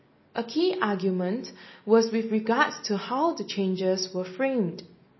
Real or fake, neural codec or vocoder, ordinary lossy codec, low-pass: real; none; MP3, 24 kbps; 7.2 kHz